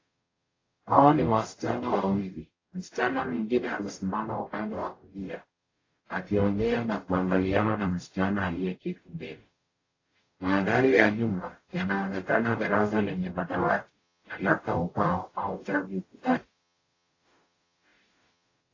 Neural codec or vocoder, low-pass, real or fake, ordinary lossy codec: codec, 44.1 kHz, 0.9 kbps, DAC; 7.2 kHz; fake; AAC, 32 kbps